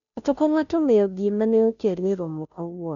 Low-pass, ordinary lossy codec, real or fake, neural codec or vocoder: 7.2 kHz; none; fake; codec, 16 kHz, 0.5 kbps, FunCodec, trained on Chinese and English, 25 frames a second